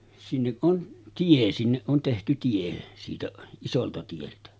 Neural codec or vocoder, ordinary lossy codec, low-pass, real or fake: none; none; none; real